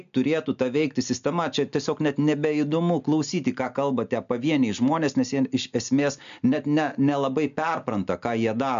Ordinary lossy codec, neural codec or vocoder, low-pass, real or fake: MP3, 64 kbps; none; 7.2 kHz; real